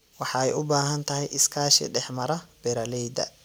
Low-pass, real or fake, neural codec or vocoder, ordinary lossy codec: none; real; none; none